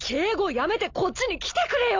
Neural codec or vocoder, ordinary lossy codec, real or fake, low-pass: none; MP3, 64 kbps; real; 7.2 kHz